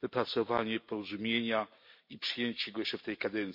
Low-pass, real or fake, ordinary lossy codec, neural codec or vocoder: 5.4 kHz; real; MP3, 32 kbps; none